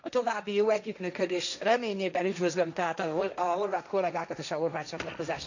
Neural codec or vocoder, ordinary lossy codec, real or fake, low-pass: codec, 16 kHz, 1.1 kbps, Voila-Tokenizer; none; fake; 7.2 kHz